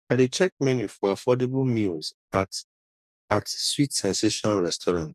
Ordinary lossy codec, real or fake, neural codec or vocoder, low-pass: none; fake; codec, 44.1 kHz, 2.6 kbps, DAC; 14.4 kHz